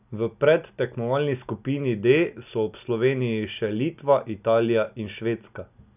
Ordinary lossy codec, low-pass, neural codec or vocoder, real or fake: none; 3.6 kHz; none; real